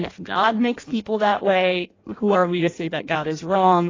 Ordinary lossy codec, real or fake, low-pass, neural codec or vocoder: AAC, 32 kbps; fake; 7.2 kHz; codec, 16 kHz in and 24 kHz out, 0.6 kbps, FireRedTTS-2 codec